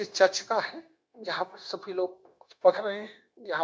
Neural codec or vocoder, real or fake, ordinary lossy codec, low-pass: codec, 16 kHz, 0.9 kbps, LongCat-Audio-Codec; fake; none; none